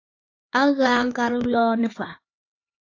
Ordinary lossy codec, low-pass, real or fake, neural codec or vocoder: AAC, 32 kbps; 7.2 kHz; fake; codec, 16 kHz, 4 kbps, X-Codec, HuBERT features, trained on LibriSpeech